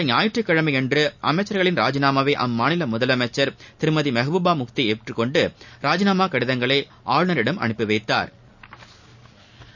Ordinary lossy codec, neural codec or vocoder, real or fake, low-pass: none; none; real; 7.2 kHz